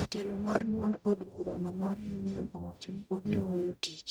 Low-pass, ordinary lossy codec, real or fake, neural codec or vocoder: none; none; fake; codec, 44.1 kHz, 0.9 kbps, DAC